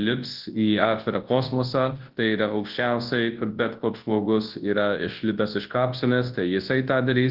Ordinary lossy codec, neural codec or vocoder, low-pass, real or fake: Opus, 16 kbps; codec, 24 kHz, 0.9 kbps, WavTokenizer, large speech release; 5.4 kHz; fake